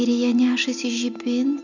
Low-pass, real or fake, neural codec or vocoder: 7.2 kHz; real; none